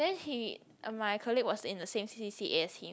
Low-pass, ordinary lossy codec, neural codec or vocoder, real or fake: none; none; none; real